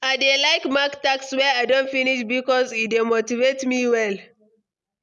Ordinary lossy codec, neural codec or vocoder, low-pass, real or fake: none; none; none; real